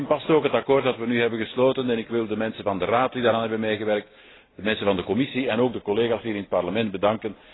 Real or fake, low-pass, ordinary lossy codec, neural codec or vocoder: real; 7.2 kHz; AAC, 16 kbps; none